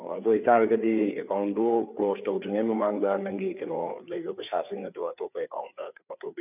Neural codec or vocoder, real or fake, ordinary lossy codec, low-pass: codec, 16 kHz, 4 kbps, FreqCodec, larger model; fake; none; 3.6 kHz